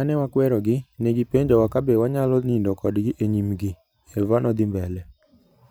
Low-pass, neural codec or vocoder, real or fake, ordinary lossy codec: none; vocoder, 44.1 kHz, 128 mel bands every 512 samples, BigVGAN v2; fake; none